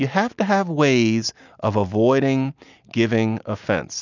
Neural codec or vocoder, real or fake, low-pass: none; real; 7.2 kHz